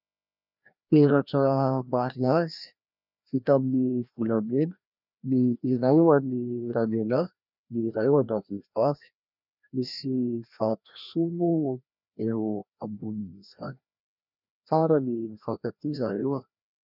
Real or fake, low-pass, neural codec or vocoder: fake; 5.4 kHz; codec, 16 kHz, 1 kbps, FreqCodec, larger model